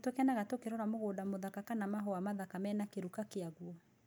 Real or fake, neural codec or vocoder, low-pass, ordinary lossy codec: real; none; none; none